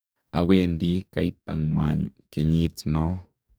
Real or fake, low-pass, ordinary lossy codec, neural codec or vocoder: fake; none; none; codec, 44.1 kHz, 2.6 kbps, DAC